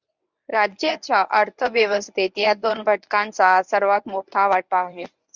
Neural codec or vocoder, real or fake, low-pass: codec, 24 kHz, 0.9 kbps, WavTokenizer, medium speech release version 1; fake; 7.2 kHz